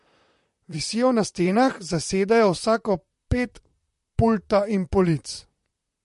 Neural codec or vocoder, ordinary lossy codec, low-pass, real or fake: vocoder, 44.1 kHz, 128 mel bands, Pupu-Vocoder; MP3, 48 kbps; 14.4 kHz; fake